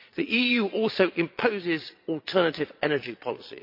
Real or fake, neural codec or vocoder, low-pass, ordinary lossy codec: fake; vocoder, 22.05 kHz, 80 mel bands, Vocos; 5.4 kHz; MP3, 32 kbps